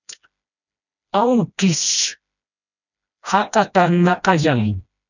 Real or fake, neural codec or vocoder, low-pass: fake; codec, 16 kHz, 1 kbps, FreqCodec, smaller model; 7.2 kHz